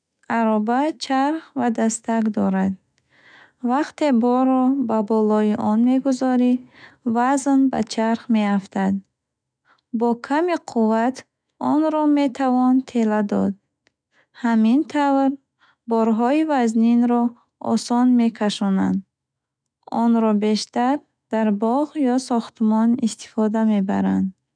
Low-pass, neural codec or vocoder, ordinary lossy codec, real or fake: 9.9 kHz; autoencoder, 48 kHz, 128 numbers a frame, DAC-VAE, trained on Japanese speech; none; fake